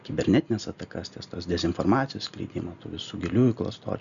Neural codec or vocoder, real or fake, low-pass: none; real; 7.2 kHz